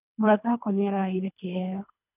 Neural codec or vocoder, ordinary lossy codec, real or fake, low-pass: codec, 24 kHz, 3 kbps, HILCodec; none; fake; 3.6 kHz